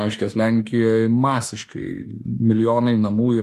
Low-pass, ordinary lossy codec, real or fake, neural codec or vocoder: 14.4 kHz; AAC, 64 kbps; fake; autoencoder, 48 kHz, 32 numbers a frame, DAC-VAE, trained on Japanese speech